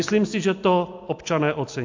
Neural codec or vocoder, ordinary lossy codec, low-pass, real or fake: none; MP3, 48 kbps; 7.2 kHz; real